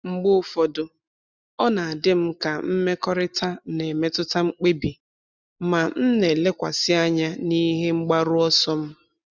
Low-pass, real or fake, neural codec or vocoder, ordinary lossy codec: 7.2 kHz; real; none; none